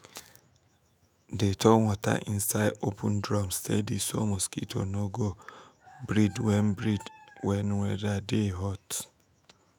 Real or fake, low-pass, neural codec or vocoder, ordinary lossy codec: fake; none; autoencoder, 48 kHz, 128 numbers a frame, DAC-VAE, trained on Japanese speech; none